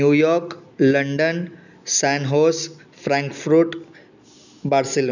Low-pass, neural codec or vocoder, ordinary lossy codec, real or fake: 7.2 kHz; none; none; real